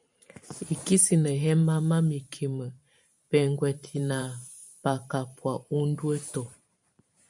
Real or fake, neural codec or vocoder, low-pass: fake; vocoder, 44.1 kHz, 128 mel bands every 512 samples, BigVGAN v2; 10.8 kHz